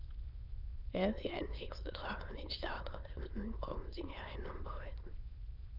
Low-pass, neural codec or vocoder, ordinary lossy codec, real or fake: 5.4 kHz; autoencoder, 22.05 kHz, a latent of 192 numbers a frame, VITS, trained on many speakers; Opus, 24 kbps; fake